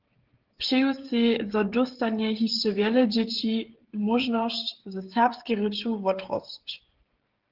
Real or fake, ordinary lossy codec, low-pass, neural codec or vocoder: fake; Opus, 16 kbps; 5.4 kHz; codec, 16 kHz, 16 kbps, FreqCodec, smaller model